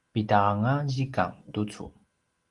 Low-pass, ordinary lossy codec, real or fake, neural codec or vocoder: 10.8 kHz; Opus, 24 kbps; real; none